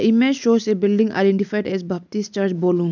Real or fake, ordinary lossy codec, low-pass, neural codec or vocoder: real; none; 7.2 kHz; none